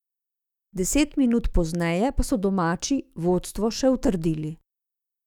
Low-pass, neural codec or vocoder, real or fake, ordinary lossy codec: 19.8 kHz; autoencoder, 48 kHz, 128 numbers a frame, DAC-VAE, trained on Japanese speech; fake; none